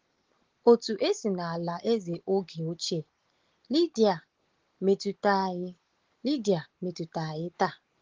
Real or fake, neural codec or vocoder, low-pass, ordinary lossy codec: real; none; 7.2 kHz; Opus, 16 kbps